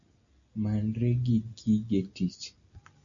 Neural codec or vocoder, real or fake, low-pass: none; real; 7.2 kHz